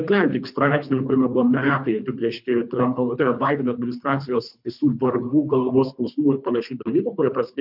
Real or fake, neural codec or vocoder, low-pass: fake; codec, 24 kHz, 3 kbps, HILCodec; 5.4 kHz